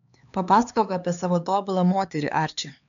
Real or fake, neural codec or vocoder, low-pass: fake; codec, 16 kHz, 2 kbps, X-Codec, HuBERT features, trained on LibriSpeech; 7.2 kHz